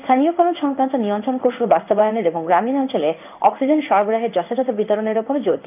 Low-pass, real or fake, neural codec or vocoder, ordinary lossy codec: 3.6 kHz; fake; codec, 16 kHz in and 24 kHz out, 1 kbps, XY-Tokenizer; none